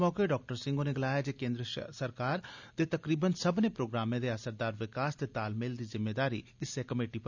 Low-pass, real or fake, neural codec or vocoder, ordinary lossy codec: 7.2 kHz; real; none; none